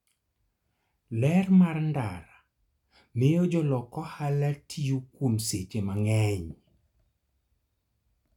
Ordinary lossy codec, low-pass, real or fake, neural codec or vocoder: none; 19.8 kHz; real; none